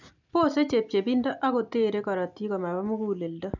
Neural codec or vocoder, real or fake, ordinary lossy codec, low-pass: none; real; none; 7.2 kHz